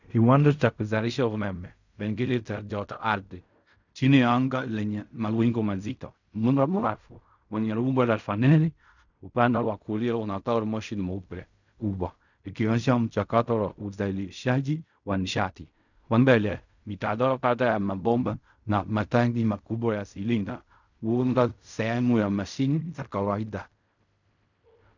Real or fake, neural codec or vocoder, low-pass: fake; codec, 16 kHz in and 24 kHz out, 0.4 kbps, LongCat-Audio-Codec, fine tuned four codebook decoder; 7.2 kHz